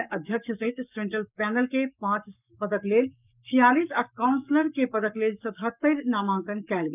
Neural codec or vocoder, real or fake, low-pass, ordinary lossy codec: codec, 44.1 kHz, 7.8 kbps, Pupu-Codec; fake; 3.6 kHz; none